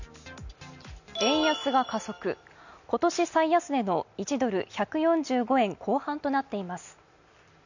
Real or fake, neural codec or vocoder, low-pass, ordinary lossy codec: real; none; 7.2 kHz; none